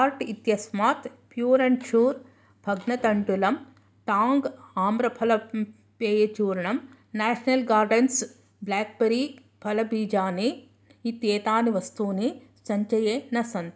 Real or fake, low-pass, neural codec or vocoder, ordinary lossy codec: real; none; none; none